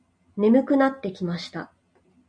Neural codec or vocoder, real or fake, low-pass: none; real; 9.9 kHz